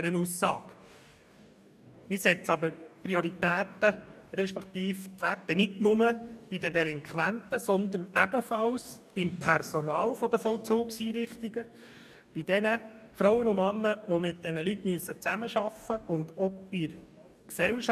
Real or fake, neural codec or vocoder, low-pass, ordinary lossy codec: fake; codec, 44.1 kHz, 2.6 kbps, DAC; 14.4 kHz; none